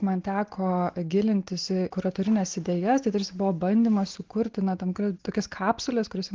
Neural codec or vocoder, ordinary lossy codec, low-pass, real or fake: none; Opus, 16 kbps; 7.2 kHz; real